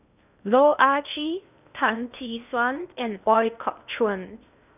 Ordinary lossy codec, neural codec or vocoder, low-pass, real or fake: none; codec, 16 kHz in and 24 kHz out, 0.6 kbps, FocalCodec, streaming, 2048 codes; 3.6 kHz; fake